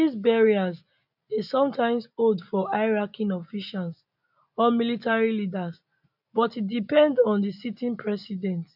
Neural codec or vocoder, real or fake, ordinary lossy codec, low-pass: none; real; none; 5.4 kHz